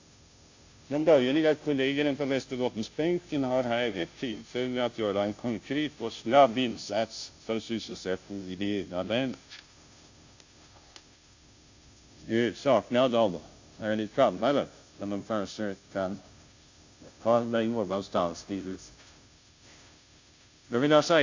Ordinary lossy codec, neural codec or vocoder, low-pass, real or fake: AAC, 48 kbps; codec, 16 kHz, 0.5 kbps, FunCodec, trained on Chinese and English, 25 frames a second; 7.2 kHz; fake